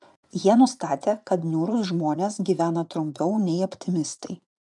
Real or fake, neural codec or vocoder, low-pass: fake; vocoder, 24 kHz, 100 mel bands, Vocos; 10.8 kHz